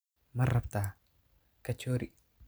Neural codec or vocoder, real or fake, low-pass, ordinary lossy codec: none; real; none; none